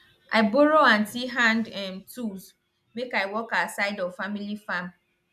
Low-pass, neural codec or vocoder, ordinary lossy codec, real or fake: 14.4 kHz; none; none; real